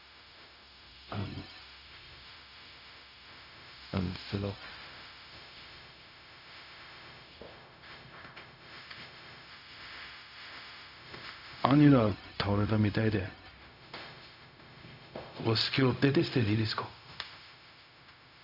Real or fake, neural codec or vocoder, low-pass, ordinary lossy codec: fake; codec, 16 kHz, 0.4 kbps, LongCat-Audio-Codec; 5.4 kHz; none